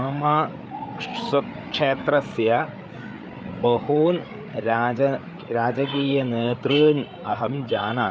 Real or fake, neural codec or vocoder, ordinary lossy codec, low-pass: fake; codec, 16 kHz, 8 kbps, FreqCodec, larger model; none; none